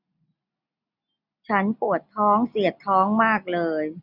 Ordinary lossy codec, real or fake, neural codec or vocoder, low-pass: none; real; none; 5.4 kHz